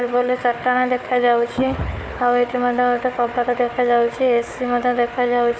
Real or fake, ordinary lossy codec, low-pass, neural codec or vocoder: fake; none; none; codec, 16 kHz, 4 kbps, FunCodec, trained on Chinese and English, 50 frames a second